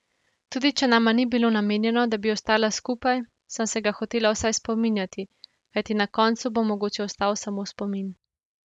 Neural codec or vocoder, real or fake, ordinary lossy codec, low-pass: none; real; none; none